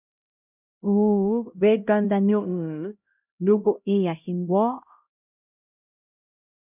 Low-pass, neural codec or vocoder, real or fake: 3.6 kHz; codec, 16 kHz, 0.5 kbps, X-Codec, HuBERT features, trained on LibriSpeech; fake